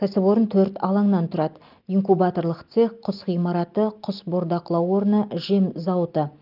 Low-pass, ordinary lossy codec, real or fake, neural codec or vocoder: 5.4 kHz; Opus, 24 kbps; real; none